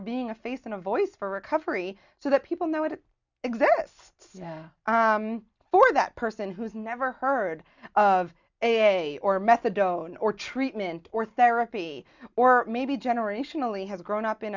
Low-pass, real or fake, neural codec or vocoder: 7.2 kHz; real; none